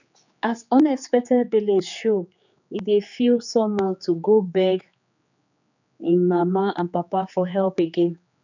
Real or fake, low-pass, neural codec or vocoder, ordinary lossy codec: fake; 7.2 kHz; codec, 16 kHz, 4 kbps, X-Codec, HuBERT features, trained on general audio; none